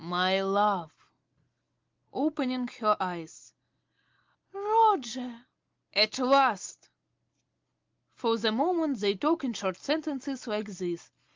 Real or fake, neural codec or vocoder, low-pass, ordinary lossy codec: real; none; 7.2 kHz; Opus, 32 kbps